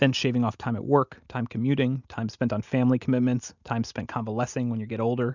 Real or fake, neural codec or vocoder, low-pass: real; none; 7.2 kHz